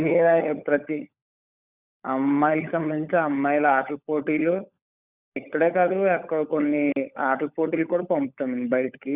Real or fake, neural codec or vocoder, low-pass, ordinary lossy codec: fake; codec, 16 kHz, 16 kbps, FunCodec, trained on LibriTTS, 50 frames a second; 3.6 kHz; Opus, 64 kbps